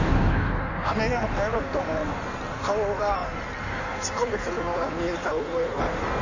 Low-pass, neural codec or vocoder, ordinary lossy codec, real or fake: 7.2 kHz; codec, 16 kHz in and 24 kHz out, 1.1 kbps, FireRedTTS-2 codec; none; fake